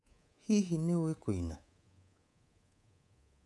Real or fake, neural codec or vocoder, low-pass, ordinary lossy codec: fake; codec, 24 kHz, 3.1 kbps, DualCodec; none; none